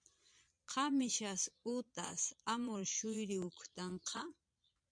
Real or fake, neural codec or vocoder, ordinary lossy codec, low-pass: fake; vocoder, 22.05 kHz, 80 mel bands, Vocos; MP3, 64 kbps; 9.9 kHz